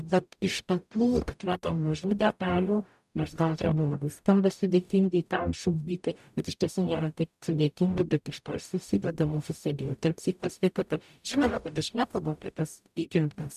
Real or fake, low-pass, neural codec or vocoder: fake; 14.4 kHz; codec, 44.1 kHz, 0.9 kbps, DAC